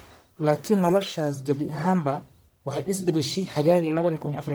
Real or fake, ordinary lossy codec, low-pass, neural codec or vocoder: fake; none; none; codec, 44.1 kHz, 1.7 kbps, Pupu-Codec